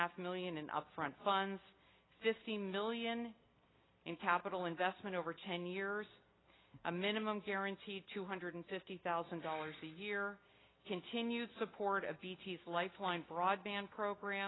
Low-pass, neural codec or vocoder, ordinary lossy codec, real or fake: 7.2 kHz; none; AAC, 16 kbps; real